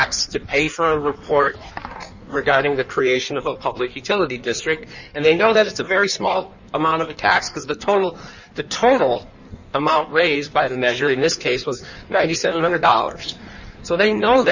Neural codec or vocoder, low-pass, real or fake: codec, 16 kHz in and 24 kHz out, 1.1 kbps, FireRedTTS-2 codec; 7.2 kHz; fake